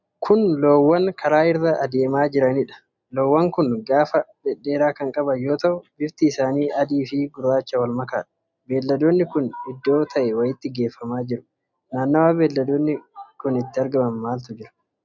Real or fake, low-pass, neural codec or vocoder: real; 7.2 kHz; none